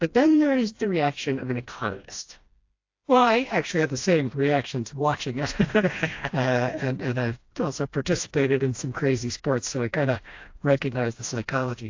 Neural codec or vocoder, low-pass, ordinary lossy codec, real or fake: codec, 16 kHz, 1 kbps, FreqCodec, smaller model; 7.2 kHz; AAC, 48 kbps; fake